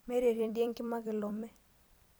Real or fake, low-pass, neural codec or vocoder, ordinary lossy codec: fake; none; vocoder, 44.1 kHz, 128 mel bands every 256 samples, BigVGAN v2; none